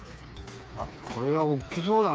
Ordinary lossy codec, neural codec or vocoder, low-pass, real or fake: none; codec, 16 kHz, 4 kbps, FreqCodec, smaller model; none; fake